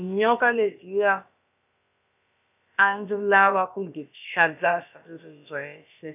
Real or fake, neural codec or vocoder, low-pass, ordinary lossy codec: fake; codec, 16 kHz, about 1 kbps, DyCAST, with the encoder's durations; 3.6 kHz; none